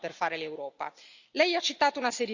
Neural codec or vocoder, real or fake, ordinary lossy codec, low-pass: none; real; Opus, 64 kbps; 7.2 kHz